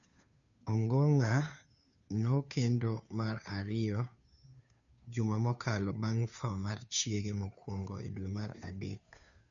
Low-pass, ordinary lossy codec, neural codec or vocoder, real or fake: 7.2 kHz; none; codec, 16 kHz, 2 kbps, FunCodec, trained on Chinese and English, 25 frames a second; fake